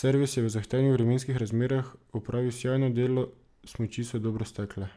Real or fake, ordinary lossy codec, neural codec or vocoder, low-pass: real; none; none; none